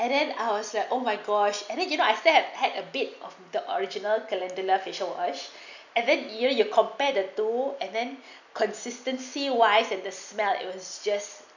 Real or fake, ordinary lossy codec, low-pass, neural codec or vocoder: real; none; 7.2 kHz; none